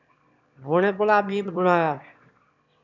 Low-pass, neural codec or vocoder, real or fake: 7.2 kHz; autoencoder, 22.05 kHz, a latent of 192 numbers a frame, VITS, trained on one speaker; fake